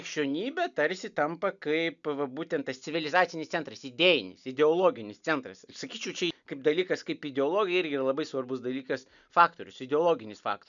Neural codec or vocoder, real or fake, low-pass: none; real; 7.2 kHz